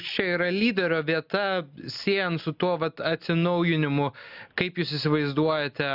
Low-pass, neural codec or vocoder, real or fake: 5.4 kHz; none; real